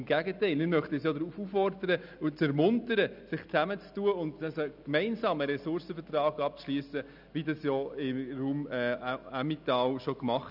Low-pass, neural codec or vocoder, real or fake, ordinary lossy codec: 5.4 kHz; none; real; none